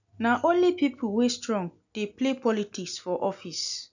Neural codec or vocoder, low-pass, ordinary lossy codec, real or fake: none; 7.2 kHz; none; real